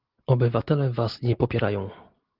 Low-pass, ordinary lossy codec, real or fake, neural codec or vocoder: 5.4 kHz; Opus, 16 kbps; real; none